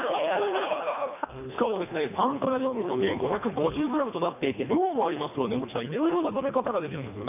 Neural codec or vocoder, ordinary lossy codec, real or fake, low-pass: codec, 24 kHz, 1.5 kbps, HILCodec; none; fake; 3.6 kHz